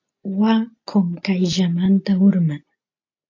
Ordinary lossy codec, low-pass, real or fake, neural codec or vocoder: AAC, 48 kbps; 7.2 kHz; real; none